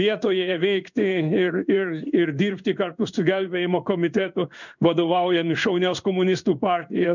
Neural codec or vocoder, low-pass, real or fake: codec, 16 kHz in and 24 kHz out, 1 kbps, XY-Tokenizer; 7.2 kHz; fake